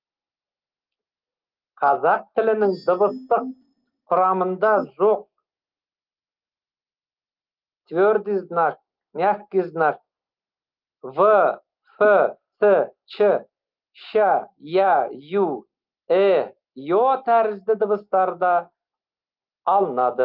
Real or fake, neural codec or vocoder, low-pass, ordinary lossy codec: real; none; 5.4 kHz; Opus, 32 kbps